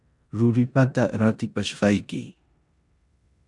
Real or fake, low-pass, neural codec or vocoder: fake; 10.8 kHz; codec, 16 kHz in and 24 kHz out, 0.9 kbps, LongCat-Audio-Codec, four codebook decoder